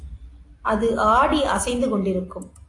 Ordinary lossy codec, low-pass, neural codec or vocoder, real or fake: AAC, 48 kbps; 10.8 kHz; none; real